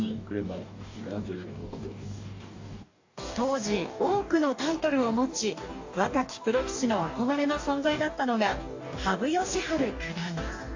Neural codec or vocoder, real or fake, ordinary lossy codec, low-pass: codec, 44.1 kHz, 2.6 kbps, DAC; fake; AAC, 48 kbps; 7.2 kHz